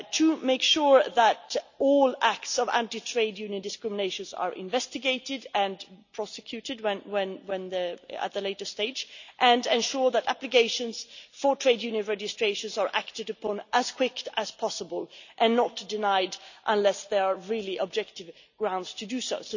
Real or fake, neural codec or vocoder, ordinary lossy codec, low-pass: real; none; none; 7.2 kHz